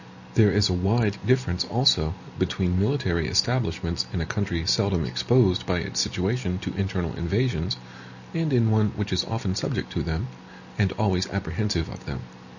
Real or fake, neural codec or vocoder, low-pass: real; none; 7.2 kHz